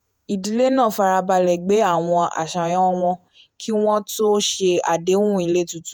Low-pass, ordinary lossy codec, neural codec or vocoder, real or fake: none; none; vocoder, 48 kHz, 128 mel bands, Vocos; fake